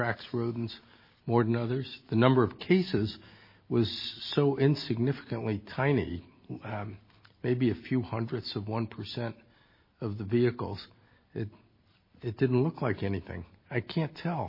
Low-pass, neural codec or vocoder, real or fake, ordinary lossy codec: 5.4 kHz; none; real; MP3, 24 kbps